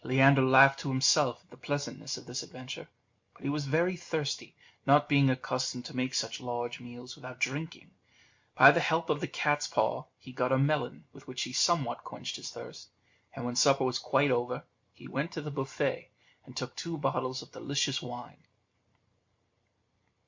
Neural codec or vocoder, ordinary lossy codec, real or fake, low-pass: none; MP3, 48 kbps; real; 7.2 kHz